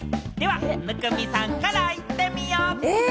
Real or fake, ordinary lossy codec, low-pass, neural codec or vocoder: real; none; none; none